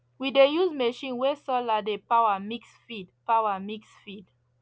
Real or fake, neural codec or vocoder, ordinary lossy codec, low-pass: real; none; none; none